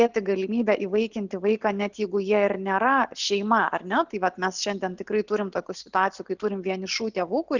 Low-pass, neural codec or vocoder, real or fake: 7.2 kHz; none; real